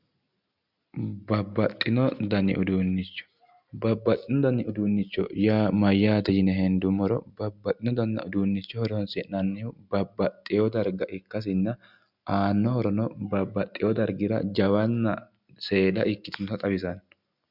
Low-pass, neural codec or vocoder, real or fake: 5.4 kHz; none; real